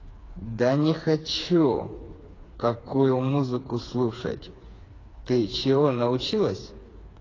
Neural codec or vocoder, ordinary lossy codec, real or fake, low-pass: codec, 16 kHz, 4 kbps, FreqCodec, smaller model; AAC, 32 kbps; fake; 7.2 kHz